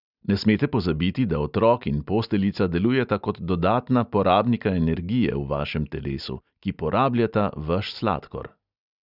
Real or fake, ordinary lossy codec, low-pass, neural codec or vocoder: real; none; 5.4 kHz; none